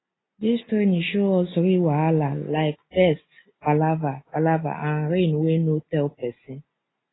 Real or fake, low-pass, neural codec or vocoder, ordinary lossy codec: real; 7.2 kHz; none; AAC, 16 kbps